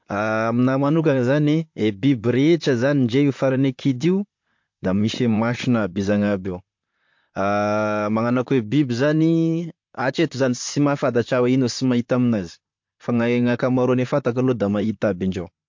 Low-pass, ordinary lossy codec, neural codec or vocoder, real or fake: 7.2 kHz; MP3, 48 kbps; vocoder, 44.1 kHz, 128 mel bands, Pupu-Vocoder; fake